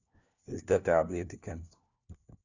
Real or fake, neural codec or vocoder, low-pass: fake; codec, 16 kHz, 1 kbps, FunCodec, trained on LibriTTS, 50 frames a second; 7.2 kHz